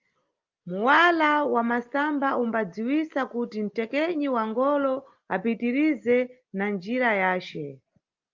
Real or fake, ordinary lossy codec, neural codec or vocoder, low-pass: real; Opus, 32 kbps; none; 7.2 kHz